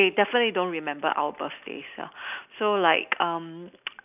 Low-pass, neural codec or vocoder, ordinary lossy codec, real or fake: 3.6 kHz; none; none; real